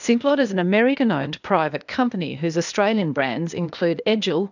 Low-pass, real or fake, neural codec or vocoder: 7.2 kHz; fake; codec, 16 kHz, 0.8 kbps, ZipCodec